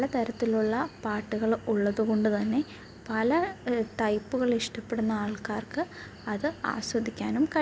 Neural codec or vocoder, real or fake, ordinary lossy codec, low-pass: none; real; none; none